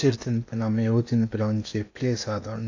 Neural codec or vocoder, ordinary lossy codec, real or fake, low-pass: codec, 16 kHz in and 24 kHz out, 0.8 kbps, FocalCodec, streaming, 65536 codes; AAC, 48 kbps; fake; 7.2 kHz